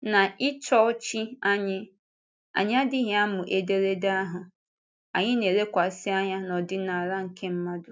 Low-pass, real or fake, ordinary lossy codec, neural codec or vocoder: none; real; none; none